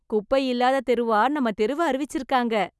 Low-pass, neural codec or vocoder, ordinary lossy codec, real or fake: none; none; none; real